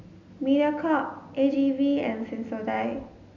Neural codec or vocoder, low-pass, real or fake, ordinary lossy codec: none; 7.2 kHz; real; none